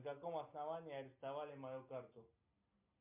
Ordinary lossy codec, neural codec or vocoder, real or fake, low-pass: MP3, 32 kbps; none; real; 3.6 kHz